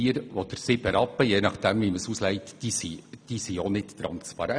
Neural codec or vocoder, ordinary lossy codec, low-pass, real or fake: none; none; 9.9 kHz; real